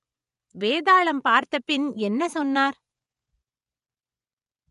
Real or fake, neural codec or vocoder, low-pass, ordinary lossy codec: fake; vocoder, 24 kHz, 100 mel bands, Vocos; 10.8 kHz; none